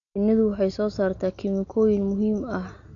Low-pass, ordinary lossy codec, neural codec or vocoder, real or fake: 7.2 kHz; none; none; real